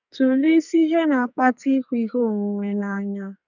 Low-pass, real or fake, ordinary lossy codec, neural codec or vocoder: 7.2 kHz; fake; none; codec, 44.1 kHz, 2.6 kbps, SNAC